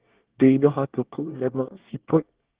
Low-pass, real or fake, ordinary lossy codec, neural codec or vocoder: 3.6 kHz; fake; Opus, 16 kbps; codec, 24 kHz, 1 kbps, SNAC